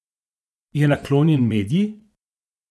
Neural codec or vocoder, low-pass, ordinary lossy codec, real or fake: vocoder, 24 kHz, 100 mel bands, Vocos; none; none; fake